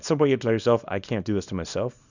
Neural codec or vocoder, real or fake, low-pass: codec, 24 kHz, 0.9 kbps, WavTokenizer, small release; fake; 7.2 kHz